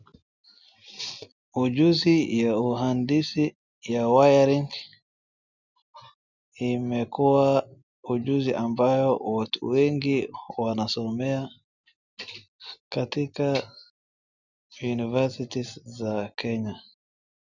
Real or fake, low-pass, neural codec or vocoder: real; 7.2 kHz; none